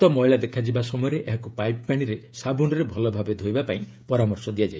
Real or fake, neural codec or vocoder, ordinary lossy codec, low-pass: fake; codec, 16 kHz, 16 kbps, FreqCodec, smaller model; none; none